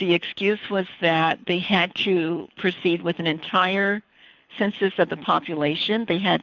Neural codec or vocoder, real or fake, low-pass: codec, 24 kHz, 6 kbps, HILCodec; fake; 7.2 kHz